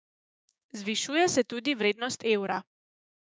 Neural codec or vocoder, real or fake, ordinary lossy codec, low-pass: codec, 16 kHz, 6 kbps, DAC; fake; none; none